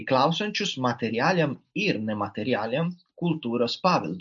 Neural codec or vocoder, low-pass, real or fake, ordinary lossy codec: none; 7.2 kHz; real; MP3, 64 kbps